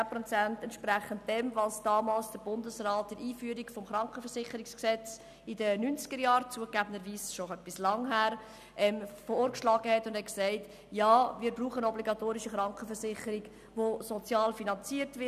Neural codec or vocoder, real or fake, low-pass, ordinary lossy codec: none; real; 14.4 kHz; none